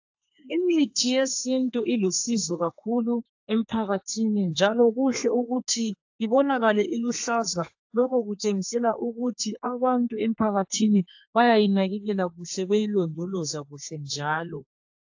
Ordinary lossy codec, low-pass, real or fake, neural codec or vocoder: AAC, 48 kbps; 7.2 kHz; fake; codec, 32 kHz, 1.9 kbps, SNAC